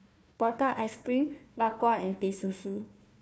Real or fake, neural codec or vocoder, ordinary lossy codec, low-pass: fake; codec, 16 kHz, 1 kbps, FunCodec, trained on Chinese and English, 50 frames a second; none; none